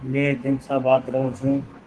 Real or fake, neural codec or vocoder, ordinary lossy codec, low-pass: fake; codec, 32 kHz, 1.9 kbps, SNAC; Opus, 16 kbps; 10.8 kHz